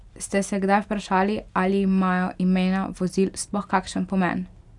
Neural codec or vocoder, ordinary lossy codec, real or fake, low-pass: none; none; real; 10.8 kHz